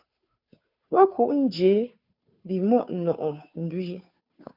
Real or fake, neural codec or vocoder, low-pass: fake; codec, 16 kHz, 2 kbps, FunCodec, trained on Chinese and English, 25 frames a second; 5.4 kHz